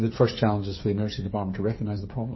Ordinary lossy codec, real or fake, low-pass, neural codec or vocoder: MP3, 24 kbps; fake; 7.2 kHz; codec, 16 kHz, 8 kbps, FreqCodec, smaller model